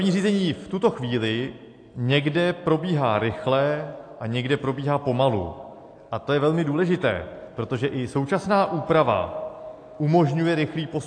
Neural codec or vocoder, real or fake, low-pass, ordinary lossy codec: none; real; 9.9 kHz; AAC, 48 kbps